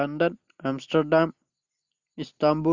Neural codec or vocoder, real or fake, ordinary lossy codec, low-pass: none; real; Opus, 64 kbps; 7.2 kHz